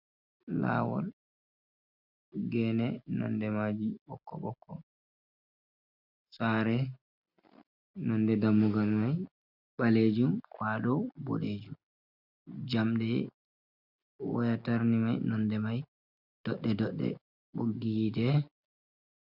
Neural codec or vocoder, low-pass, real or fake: none; 5.4 kHz; real